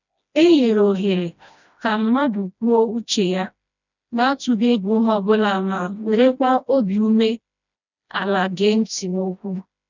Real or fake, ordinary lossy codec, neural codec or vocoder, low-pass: fake; none; codec, 16 kHz, 1 kbps, FreqCodec, smaller model; 7.2 kHz